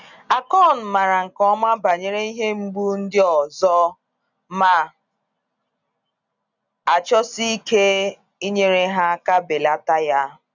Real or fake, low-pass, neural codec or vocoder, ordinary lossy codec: real; 7.2 kHz; none; none